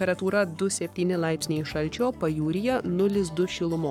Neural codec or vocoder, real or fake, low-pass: codec, 44.1 kHz, 7.8 kbps, Pupu-Codec; fake; 19.8 kHz